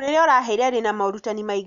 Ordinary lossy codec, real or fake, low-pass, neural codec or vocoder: none; real; 7.2 kHz; none